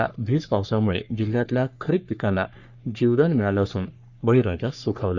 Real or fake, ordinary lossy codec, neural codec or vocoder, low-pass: fake; Opus, 64 kbps; codec, 44.1 kHz, 3.4 kbps, Pupu-Codec; 7.2 kHz